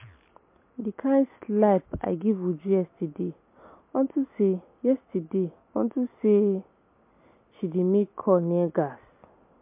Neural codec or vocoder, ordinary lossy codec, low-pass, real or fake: none; MP3, 24 kbps; 3.6 kHz; real